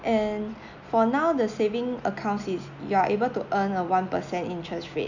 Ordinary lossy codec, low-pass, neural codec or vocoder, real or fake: none; 7.2 kHz; none; real